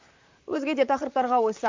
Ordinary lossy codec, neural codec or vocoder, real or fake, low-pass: MP3, 64 kbps; vocoder, 44.1 kHz, 128 mel bands, Pupu-Vocoder; fake; 7.2 kHz